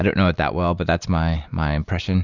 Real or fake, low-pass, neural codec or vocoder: real; 7.2 kHz; none